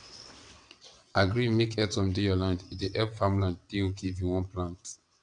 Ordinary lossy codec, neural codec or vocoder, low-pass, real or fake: none; vocoder, 22.05 kHz, 80 mel bands, WaveNeXt; 9.9 kHz; fake